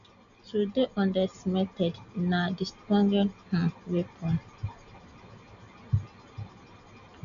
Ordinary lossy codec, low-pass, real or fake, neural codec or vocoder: AAC, 96 kbps; 7.2 kHz; real; none